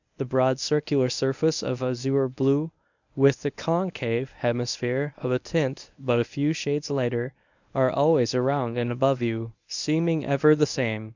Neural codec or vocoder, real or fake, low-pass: codec, 24 kHz, 0.9 kbps, WavTokenizer, medium speech release version 1; fake; 7.2 kHz